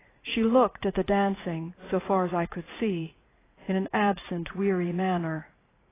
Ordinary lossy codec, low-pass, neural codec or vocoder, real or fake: AAC, 16 kbps; 3.6 kHz; vocoder, 44.1 kHz, 80 mel bands, Vocos; fake